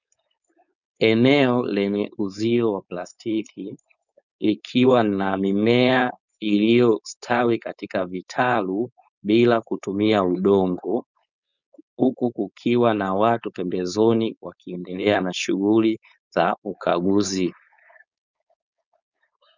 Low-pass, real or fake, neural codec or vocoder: 7.2 kHz; fake; codec, 16 kHz, 4.8 kbps, FACodec